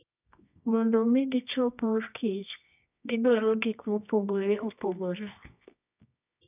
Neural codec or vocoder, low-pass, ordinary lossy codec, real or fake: codec, 24 kHz, 0.9 kbps, WavTokenizer, medium music audio release; 3.6 kHz; none; fake